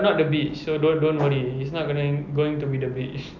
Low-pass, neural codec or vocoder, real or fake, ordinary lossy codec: 7.2 kHz; none; real; none